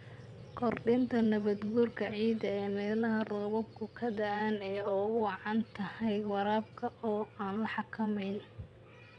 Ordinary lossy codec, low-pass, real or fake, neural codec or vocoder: none; 9.9 kHz; fake; vocoder, 22.05 kHz, 80 mel bands, WaveNeXt